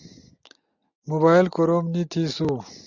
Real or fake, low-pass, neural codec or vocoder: real; 7.2 kHz; none